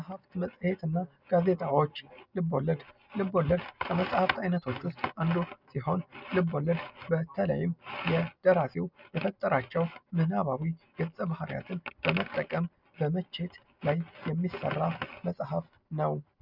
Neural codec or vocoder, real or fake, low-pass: none; real; 5.4 kHz